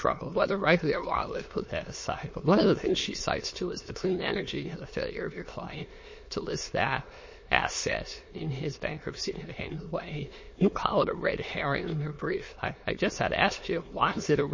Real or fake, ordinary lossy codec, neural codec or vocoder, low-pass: fake; MP3, 32 kbps; autoencoder, 22.05 kHz, a latent of 192 numbers a frame, VITS, trained on many speakers; 7.2 kHz